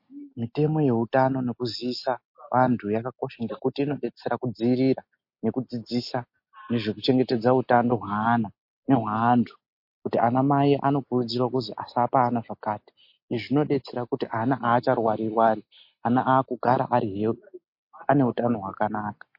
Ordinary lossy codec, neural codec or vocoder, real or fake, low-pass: MP3, 32 kbps; none; real; 5.4 kHz